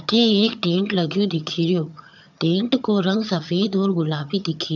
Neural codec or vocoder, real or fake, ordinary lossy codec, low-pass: vocoder, 22.05 kHz, 80 mel bands, HiFi-GAN; fake; none; 7.2 kHz